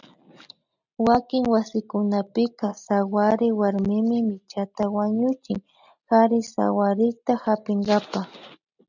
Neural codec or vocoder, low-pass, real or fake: none; 7.2 kHz; real